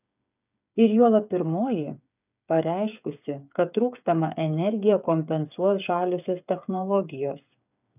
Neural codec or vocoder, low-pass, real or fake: codec, 16 kHz, 8 kbps, FreqCodec, smaller model; 3.6 kHz; fake